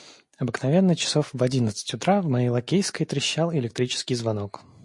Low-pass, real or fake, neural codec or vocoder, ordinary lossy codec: 10.8 kHz; real; none; MP3, 48 kbps